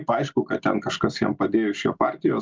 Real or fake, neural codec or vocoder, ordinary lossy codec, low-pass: real; none; Opus, 24 kbps; 7.2 kHz